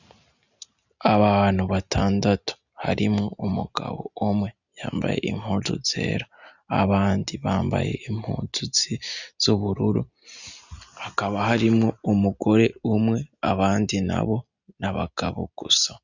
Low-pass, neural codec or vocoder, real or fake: 7.2 kHz; none; real